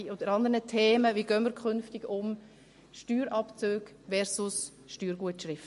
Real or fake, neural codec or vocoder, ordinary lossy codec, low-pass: real; none; MP3, 48 kbps; 14.4 kHz